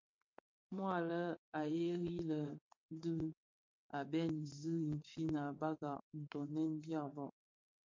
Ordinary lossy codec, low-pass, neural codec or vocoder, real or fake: MP3, 48 kbps; 7.2 kHz; vocoder, 22.05 kHz, 80 mel bands, WaveNeXt; fake